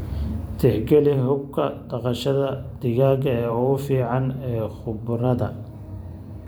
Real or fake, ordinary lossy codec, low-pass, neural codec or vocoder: fake; none; none; vocoder, 44.1 kHz, 128 mel bands every 512 samples, BigVGAN v2